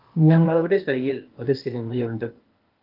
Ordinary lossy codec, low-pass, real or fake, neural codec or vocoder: Opus, 32 kbps; 5.4 kHz; fake; codec, 16 kHz, 0.8 kbps, ZipCodec